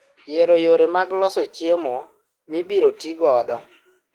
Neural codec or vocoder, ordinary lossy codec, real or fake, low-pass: autoencoder, 48 kHz, 32 numbers a frame, DAC-VAE, trained on Japanese speech; Opus, 24 kbps; fake; 19.8 kHz